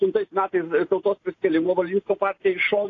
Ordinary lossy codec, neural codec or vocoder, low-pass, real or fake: AAC, 32 kbps; none; 7.2 kHz; real